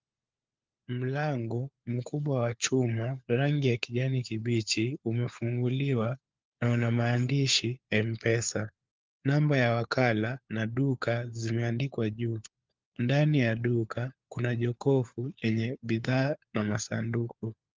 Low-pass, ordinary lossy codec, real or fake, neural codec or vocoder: 7.2 kHz; Opus, 24 kbps; fake; codec, 16 kHz, 4 kbps, FunCodec, trained on LibriTTS, 50 frames a second